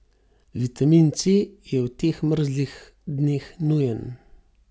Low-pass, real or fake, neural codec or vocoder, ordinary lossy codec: none; real; none; none